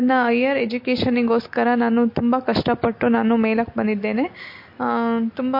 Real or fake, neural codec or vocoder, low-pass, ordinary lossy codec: real; none; 5.4 kHz; MP3, 32 kbps